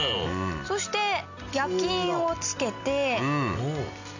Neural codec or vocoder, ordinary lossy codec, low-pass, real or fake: none; none; 7.2 kHz; real